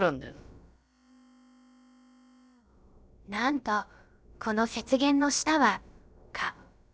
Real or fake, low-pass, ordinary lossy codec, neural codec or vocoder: fake; none; none; codec, 16 kHz, about 1 kbps, DyCAST, with the encoder's durations